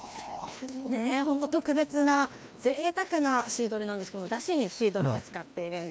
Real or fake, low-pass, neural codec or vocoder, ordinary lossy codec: fake; none; codec, 16 kHz, 1 kbps, FreqCodec, larger model; none